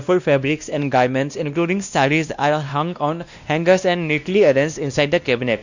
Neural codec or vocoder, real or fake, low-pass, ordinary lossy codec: codec, 16 kHz, 1 kbps, X-Codec, WavLM features, trained on Multilingual LibriSpeech; fake; 7.2 kHz; none